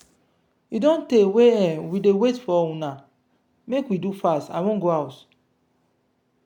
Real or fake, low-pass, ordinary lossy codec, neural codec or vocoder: real; 19.8 kHz; none; none